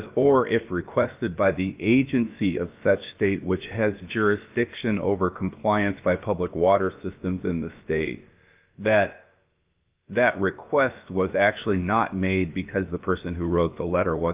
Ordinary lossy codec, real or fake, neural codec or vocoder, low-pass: Opus, 24 kbps; fake; codec, 16 kHz, about 1 kbps, DyCAST, with the encoder's durations; 3.6 kHz